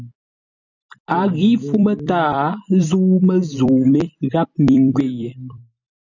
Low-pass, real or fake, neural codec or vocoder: 7.2 kHz; fake; vocoder, 44.1 kHz, 128 mel bands every 256 samples, BigVGAN v2